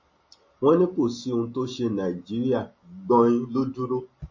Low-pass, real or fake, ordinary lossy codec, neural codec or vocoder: 7.2 kHz; real; MP3, 32 kbps; none